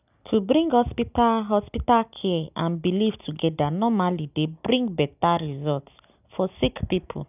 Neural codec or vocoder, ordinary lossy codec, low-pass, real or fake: none; none; 3.6 kHz; real